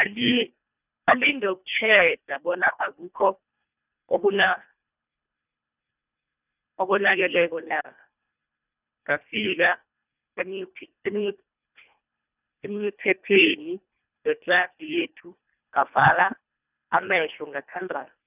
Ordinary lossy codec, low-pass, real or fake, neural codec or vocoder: none; 3.6 kHz; fake; codec, 24 kHz, 1.5 kbps, HILCodec